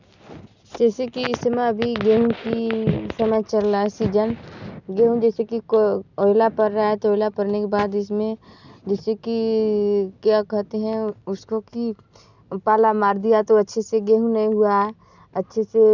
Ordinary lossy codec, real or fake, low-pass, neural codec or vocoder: none; real; 7.2 kHz; none